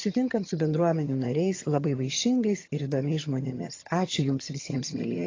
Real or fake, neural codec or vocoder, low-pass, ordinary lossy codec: fake; vocoder, 22.05 kHz, 80 mel bands, HiFi-GAN; 7.2 kHz; AAC, 32 kbps